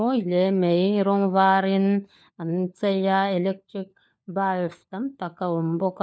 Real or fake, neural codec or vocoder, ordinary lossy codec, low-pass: fake; codec, 16 kHz, 4 kbps, FunCodec, trained on LibriTTS, 50 frames a second; none; none